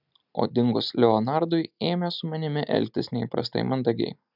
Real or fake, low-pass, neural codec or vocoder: real; 5.4 kHz; none